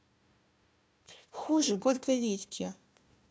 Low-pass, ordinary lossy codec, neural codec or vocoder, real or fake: none; none; codec, 16 kHz, 1 kbps, FunCodec, trained on Chinese and English, 50 frames a second; fake